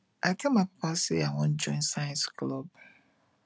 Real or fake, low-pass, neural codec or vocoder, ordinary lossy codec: real; none; none; none